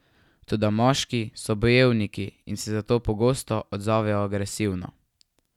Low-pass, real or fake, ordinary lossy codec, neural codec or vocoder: 19.8 kHz; real; none; none